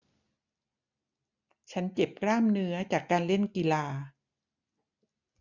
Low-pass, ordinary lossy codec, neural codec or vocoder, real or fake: 7.2 kHz; none; none; real